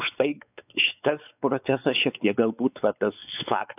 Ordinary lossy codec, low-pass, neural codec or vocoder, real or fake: AAC, 32 kbps; 3.6 kHz; codec, 16 kHz, 4 kbps, X-Codec, WavLM features, trained on Multilingual LibriSpeech; fake